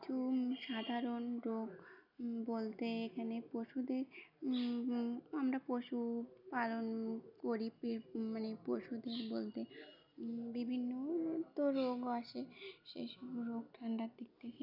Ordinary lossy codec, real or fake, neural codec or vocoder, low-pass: none; real; none; 5.4 kHz